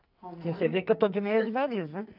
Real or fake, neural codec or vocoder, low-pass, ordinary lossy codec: fake; codec, 44.1 kHz, 2.6 kbps, SNAC; 5.4 kHz; none